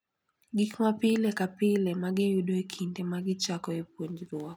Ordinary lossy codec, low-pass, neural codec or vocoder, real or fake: none; 19.8 kHz; none; real